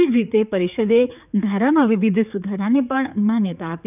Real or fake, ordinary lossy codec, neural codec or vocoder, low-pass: fake; none; codec, 16 kHz, 4 kbps, X-Codec, HuBERT features, trained on general audio; 3.6 kHz